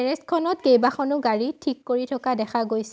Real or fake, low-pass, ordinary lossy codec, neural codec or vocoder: real; none; none; none